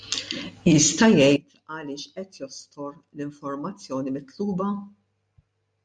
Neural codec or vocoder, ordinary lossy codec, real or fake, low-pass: none; MP3, 96 kbps; real; 9.9 kHz